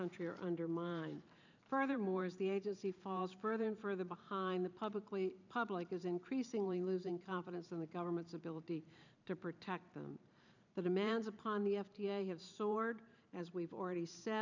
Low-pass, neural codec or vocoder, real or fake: 7.2 kHz; vocoder, 44.1 kHz, 128 mel bands every 512 samples, BigVGAN v2; fake